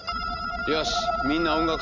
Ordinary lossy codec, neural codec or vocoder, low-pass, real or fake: none; none; 7.2 kHz; real